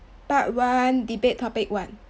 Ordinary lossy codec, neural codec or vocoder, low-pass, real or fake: none; none; none; real